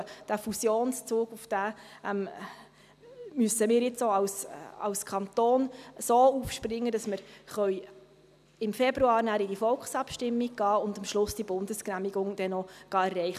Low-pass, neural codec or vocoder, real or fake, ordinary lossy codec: 14.4 kHz; none; real; none